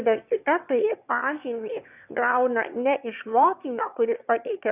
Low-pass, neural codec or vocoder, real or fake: 3.6 kHz; autoencoder, 22.05 kHz, a latent of 192 numbers a frame, VITS, trained on one speaker; fake